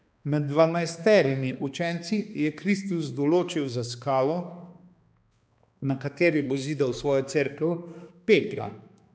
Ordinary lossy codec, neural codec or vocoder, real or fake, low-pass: none; codec, 16 kHz, 2 kbps, X-Codec, HuBERT features, trained on balanced general audio; fake; none